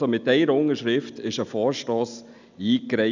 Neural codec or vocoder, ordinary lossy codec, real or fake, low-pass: none; none; real; 7.2 kHz